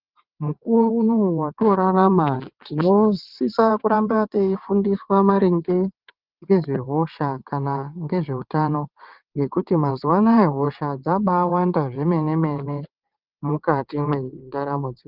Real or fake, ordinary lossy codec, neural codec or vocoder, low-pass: fake; Opus, 24 kbps; vocoder, 22.05 kHz, 80 mel bands, WaveNeXt; 5.4 kHz